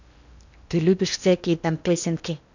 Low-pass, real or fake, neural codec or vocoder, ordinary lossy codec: 7.2 kHz; fake; codec, 16 kHz in and 24 kHz out, 0.8 kbps, FocalCodec, streaming, 65536 codes; none